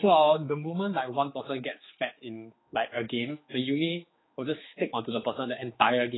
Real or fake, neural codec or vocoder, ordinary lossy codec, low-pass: fake; codec, 16 kHz, 4 kbps, X-Codec, HuBERT features, trained on general audio; AAC, 16 kbps; 7.2 kHz